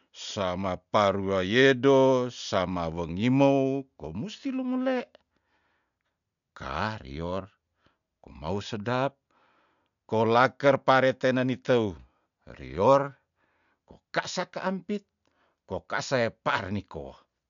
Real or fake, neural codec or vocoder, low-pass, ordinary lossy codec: real; none; 7.2 kHz; none